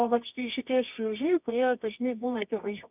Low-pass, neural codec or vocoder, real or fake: 3.6 kHz; codec, 24 kHz, 0.9 kbps, WavTokenizer, medium music audio release; fake